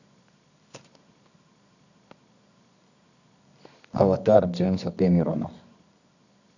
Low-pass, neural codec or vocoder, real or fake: 7.2 kHz; codec, 24 kHz, 0.9 kbps, WavTokenizer, medium music audio release; fake